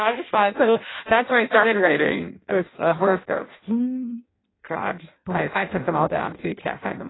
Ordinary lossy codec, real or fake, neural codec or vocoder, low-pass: AAC, 16 kbps; fake; codec, 16 kHz in and 24 kHz out, 0.6 kbps, FireRedTTS-2 codec; 7.2 kHz